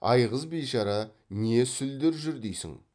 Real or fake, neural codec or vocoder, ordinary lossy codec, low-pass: real; none; none; 9.9 kHz